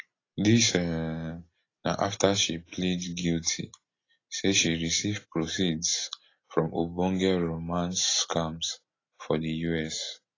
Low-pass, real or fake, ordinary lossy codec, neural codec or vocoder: 7.2 kHz; real; AAC, 32 kbps; none